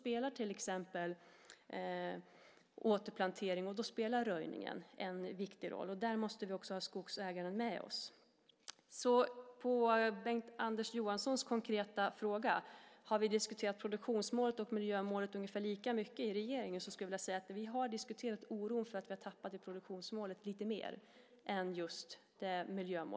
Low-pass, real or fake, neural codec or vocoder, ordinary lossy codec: none; real; none; none